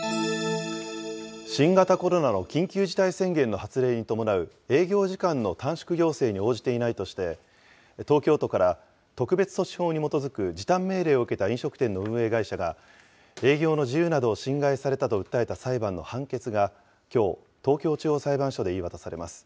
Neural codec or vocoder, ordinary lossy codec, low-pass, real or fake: none; none; none; real